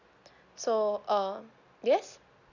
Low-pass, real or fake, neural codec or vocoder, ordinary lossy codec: 7.2 kHz; real; none; none